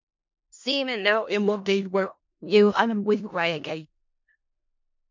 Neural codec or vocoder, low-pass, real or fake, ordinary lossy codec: codec, 16 kHz in and 24 kHz out, 0.4 kbps, LongCat-Audio-Codec, four codebook decoder; 7.2 kHz; fake; MP3, 48 kbps